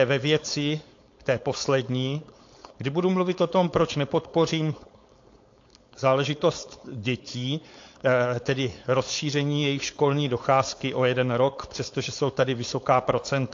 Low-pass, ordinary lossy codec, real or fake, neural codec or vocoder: 7.2 kHz; AAC, 48 kbps; fake; codec, 16 kHz, 4.8 kbps, FACodec